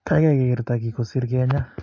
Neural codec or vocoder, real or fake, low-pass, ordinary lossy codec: none; real; 7.2 kHz; MP3, 48 kbps